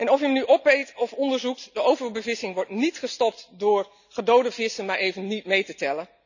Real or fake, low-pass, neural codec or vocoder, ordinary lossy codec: real; 7.2 kHz; none; none